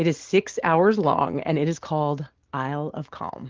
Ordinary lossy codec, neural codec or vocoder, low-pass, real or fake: Opus, 16 kbps; none; 7.2 kHz; real